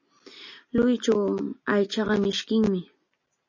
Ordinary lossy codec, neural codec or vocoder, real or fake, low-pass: MP3, 32 kbps; none; real; 7.2 kHz